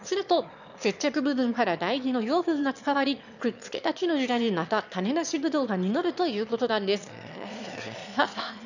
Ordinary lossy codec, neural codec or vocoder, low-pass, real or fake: none; autoencoder, 22.05 kHz, a latent of 192 numbers a frame, VITS, trained on one speaker; 7.2 kHz; fake